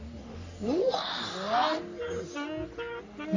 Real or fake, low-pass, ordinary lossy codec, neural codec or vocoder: fake; 7.2 kHz; AAC, 48 kbps; codec, 44.1 kHz, 3.4 kbps, Pupu-Codec